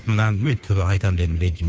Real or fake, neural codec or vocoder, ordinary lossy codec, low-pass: fake; codec, 16 kHz, 2 kbps, FunCodec, trained on Chinese and English, 25 frames a second; none; none